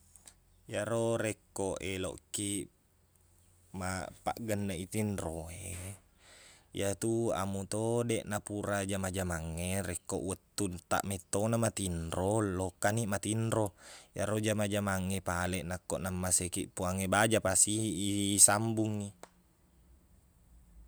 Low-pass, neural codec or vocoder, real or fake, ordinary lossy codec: none; none; real; none